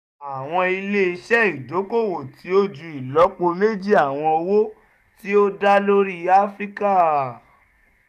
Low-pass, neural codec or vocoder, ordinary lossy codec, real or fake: 14.4 kHz; codec, 44.1 kHz, 7.8 kbps, DAC; none; fake